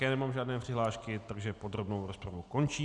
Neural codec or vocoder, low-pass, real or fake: none; 10.8 kHz; real